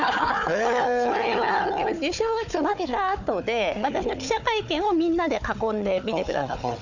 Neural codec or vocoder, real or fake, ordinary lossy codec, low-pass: codec, 16 kHz, 4 kbps, FunCodec, trained on Chinese and English, 50 frames a second; fake; none; 7.2 kHz